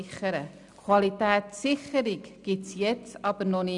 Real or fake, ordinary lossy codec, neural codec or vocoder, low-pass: real; none; none; 10.8 kHz